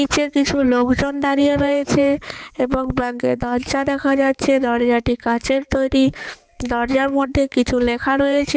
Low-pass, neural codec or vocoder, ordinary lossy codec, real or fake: none; codec, 16 kHz, 4 kbps, X-Codec, HuBERT features, trained on balanced general audio; none; fake